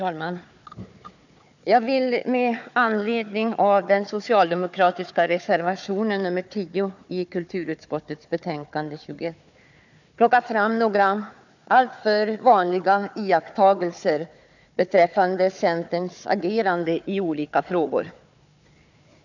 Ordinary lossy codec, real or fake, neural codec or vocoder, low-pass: none; fake; codec, 16 kHz, 4 kbps, FunCodec, trained on Chinese and English, 50 frames a second; 7.2 kHz